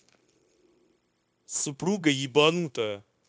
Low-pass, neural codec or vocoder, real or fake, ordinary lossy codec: none; codec, 16 kHz, 0.9 kbps, LongCat-Audio-Codec; fake; none